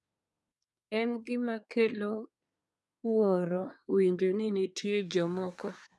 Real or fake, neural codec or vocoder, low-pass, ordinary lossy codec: fake; codec, 24 kHz, 1 kbps, SNAC; none; none